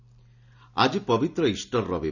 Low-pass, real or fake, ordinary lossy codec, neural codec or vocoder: 7.2 kHz; real; none; none